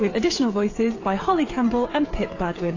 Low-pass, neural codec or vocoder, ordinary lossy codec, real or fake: 7.2 kHz; codec, 16 kHz, 16 kbps, FreqCodec, smaller model; AAC, 32 kbps; fake